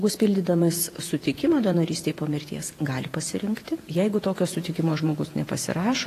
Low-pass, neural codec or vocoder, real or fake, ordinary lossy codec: 14.4 kHz; vocoder, 48 kHz, 128 mel bands, Vocos; fake; AAC, 48 kbps